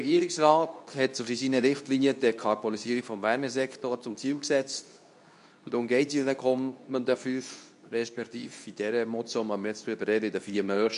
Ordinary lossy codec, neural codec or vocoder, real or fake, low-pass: none; codec, 24 kHz, 0.9 kbps, WavTokenizer, medium speech release version 2; fake; 10.8 kHz